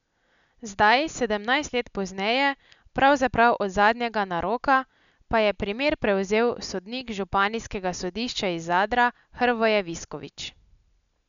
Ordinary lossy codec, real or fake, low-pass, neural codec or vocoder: none; real; 7.2 kHz; none